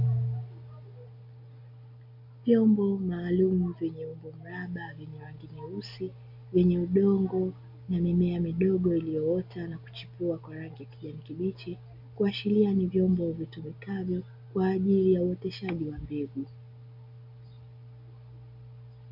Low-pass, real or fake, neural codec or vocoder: 5.4 kHz; real; none